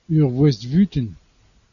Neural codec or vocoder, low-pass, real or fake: none; 7.2 kHz; real